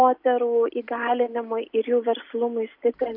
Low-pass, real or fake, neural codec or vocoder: 5.4 kHz; real; none